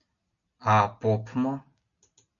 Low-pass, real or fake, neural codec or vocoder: 7.2 kHz; real; none